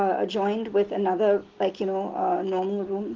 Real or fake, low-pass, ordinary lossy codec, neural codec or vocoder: real; 7.2 kHz; Opus, 16 kbps; none